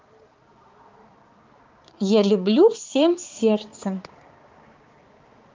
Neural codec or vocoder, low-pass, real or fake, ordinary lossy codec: codec, 16 kHz, 4 kbps, X-Codec, HuBERT features, trained on balanced general audio; 7.2 kHz; fake; Opus, 24 kbps